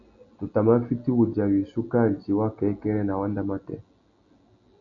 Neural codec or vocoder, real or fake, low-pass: none; real; 7.2 kHz